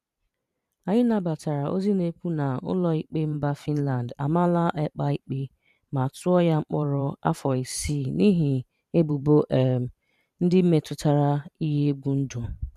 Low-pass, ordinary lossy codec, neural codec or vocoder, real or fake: 14.4 kHz; none; vocoder, 44.1 kHz, 128 mel bands every 512 samples, BigVGAN v2; fake